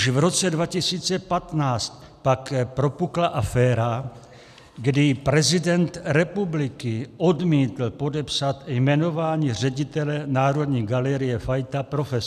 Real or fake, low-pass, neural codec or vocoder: real; 14.4 kHz; none